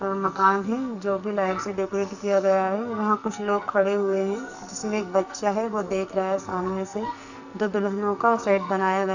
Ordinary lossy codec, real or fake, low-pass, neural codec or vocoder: none; fake; 7.2 kHz; codec, 44.1 kHz, 2.6 kbps, SNAC